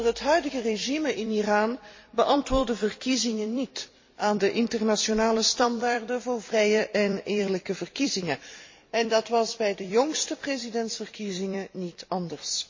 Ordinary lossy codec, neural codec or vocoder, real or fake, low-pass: MP3, 32 kbps; vocoder, 44.1 kHz, 128 mel bands every 256 samples, BigVGAN v2; fake; 7.2 kHz